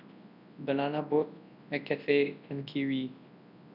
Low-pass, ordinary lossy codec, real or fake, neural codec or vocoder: 5.4 kHz; none; fake; codec, 24 kHz, 0.9 kbps, WavTokenizer, large speech release